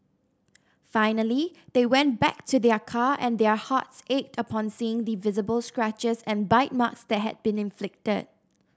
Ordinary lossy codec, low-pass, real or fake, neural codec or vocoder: none; none; real; none